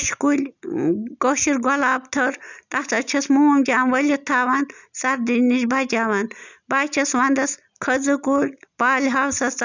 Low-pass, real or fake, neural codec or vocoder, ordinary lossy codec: 7.2 kHz; real; none; none